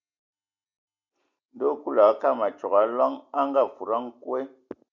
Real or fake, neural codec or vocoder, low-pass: real; none; 7.2 kHz